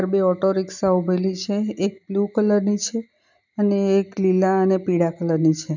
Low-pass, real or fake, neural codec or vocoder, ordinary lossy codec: 7.2 kHz; real; none; none